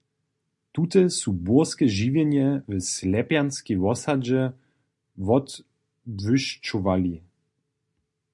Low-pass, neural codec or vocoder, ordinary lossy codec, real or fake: 10.8 kHz; none; MP3, 64 kbps; real